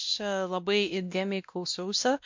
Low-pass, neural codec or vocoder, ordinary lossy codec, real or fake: 7.2 kHz; codec, 16 kHz, 1 kbps, X-Codec, WavLM features, trained on Multilingual LibriSpeech; MP3, 64 kbps; fake